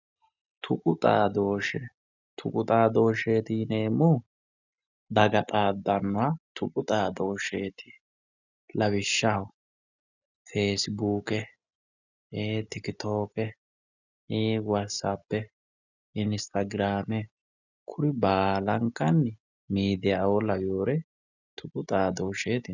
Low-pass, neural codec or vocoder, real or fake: 7.2 kHz; none; real